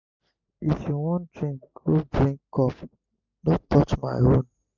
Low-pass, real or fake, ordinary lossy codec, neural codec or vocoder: 7.2 kHz; real; none; none